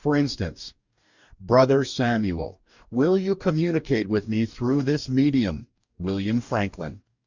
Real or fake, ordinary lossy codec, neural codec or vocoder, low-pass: fake; Opus, 64 kbps; codec, 44.1 kHz, 2.6 kbps, DAC; 7.2 kHz